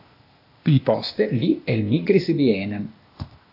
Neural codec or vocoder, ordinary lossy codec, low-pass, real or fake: codec, 16 kHz, 0.8 kbps, ZipCodec; AAC, 32 kbps; 5.4 kHz; fake